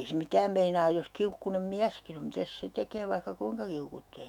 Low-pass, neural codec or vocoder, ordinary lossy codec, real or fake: 19.8 kHz; autoencoder, 48 kHz, 128 numbers a frame, DAC-VAE, trained on Japanese speech; none; fake